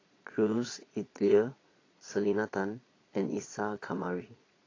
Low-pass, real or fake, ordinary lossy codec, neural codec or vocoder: 7.2 kHz; fake; AAC, 32 kbps; vocoder, 22.05 kHz, 80 mel bands, Vocos